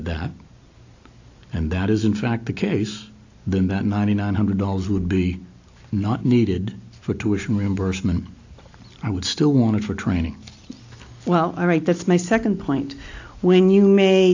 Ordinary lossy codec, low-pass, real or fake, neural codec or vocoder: AAC, 48 kbps; 7.2 kHz; real; none